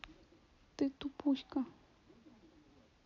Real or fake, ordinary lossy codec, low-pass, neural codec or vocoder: real; none; 7.2 kHz; none